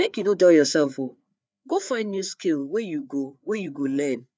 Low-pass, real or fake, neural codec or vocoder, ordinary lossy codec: none; fake; codec, 16 kHz, 4 kbps, FreqCodec, larger model; none